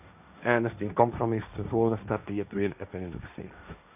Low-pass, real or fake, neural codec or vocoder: 3.6 kHz; fake; codec, 16 kHz, 1.1 kbps, Voila-Tokenizer